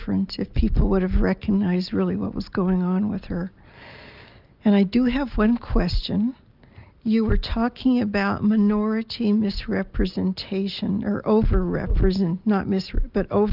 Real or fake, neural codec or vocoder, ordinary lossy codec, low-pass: real; none; Opus, 24 kbps; 5.4 kHz